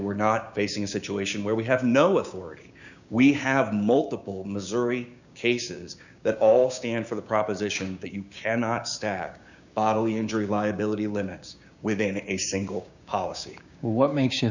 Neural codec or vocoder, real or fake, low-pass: codec, 16 kHz, 6 kbps, DAC; fake; 7.2 kHz